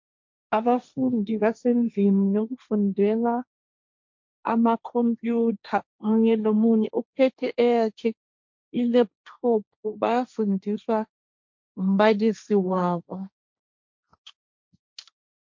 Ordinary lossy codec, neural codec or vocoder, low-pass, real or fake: MP3, 48 kbps; codec, 16 kHz, 1.1 kbps, Voila-Tokenizer; 7.2 kHz; fake